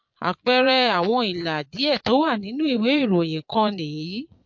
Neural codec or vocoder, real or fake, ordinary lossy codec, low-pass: vocoder, 22.05 kHz, 80 mel bands, Vocos; fake; MP3, 48 kbps; 7.2 kHz